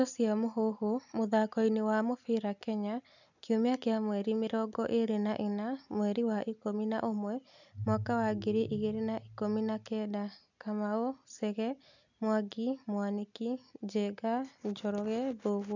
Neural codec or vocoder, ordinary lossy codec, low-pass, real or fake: none; none; 7.2 kHz; real